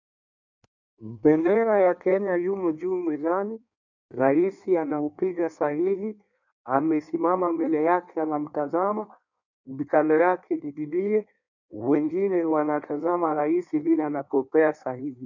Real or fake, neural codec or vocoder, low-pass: fake; codec, 16 kHz in and 24 kHz out, 1.1 kbps, FireRedTTS-2 codec; 7.2 kHz